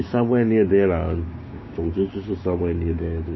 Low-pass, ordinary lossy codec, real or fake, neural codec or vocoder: 7.2 kHz; MP3, 24 kbps; fake; codec, 16 kHz, 6 kbps, DAC